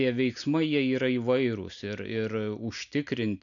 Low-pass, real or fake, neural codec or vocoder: 7.2 kHz; real; none